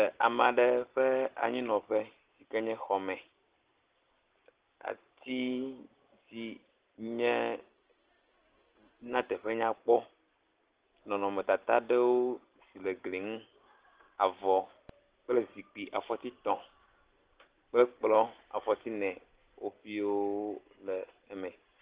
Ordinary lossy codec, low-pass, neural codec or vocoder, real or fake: Opus, 16 kbps; 3.6 kHz; none; real